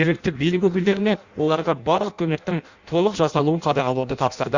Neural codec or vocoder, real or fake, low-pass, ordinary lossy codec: codec, 16 kHz in and 24 kHz out, 0.6 kbps, FireRedTTS-2 codec; fake; 7.2 kHz; Opus, 64 kbps